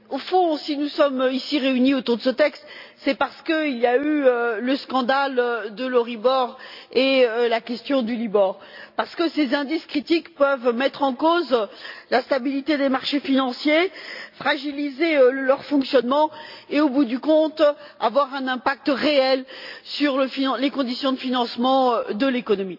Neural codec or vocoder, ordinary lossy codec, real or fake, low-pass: none; MP3, 48 kbps; real; 5.4 kHz